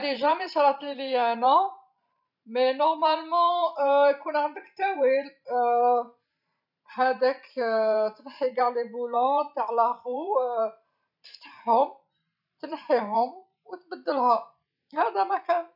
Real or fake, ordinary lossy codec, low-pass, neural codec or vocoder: fake; none; 5.4 kHz; vocoder, 44.1 kHz, 128 mel bands every 512 samples, BigVGAN v2